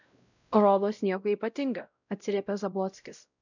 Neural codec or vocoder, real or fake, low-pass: codec, 16 kHz, 0.5 kbps, X-Codec, WavLM features, trained on Multilingual LibriSpeech; fake; 7.2 kHz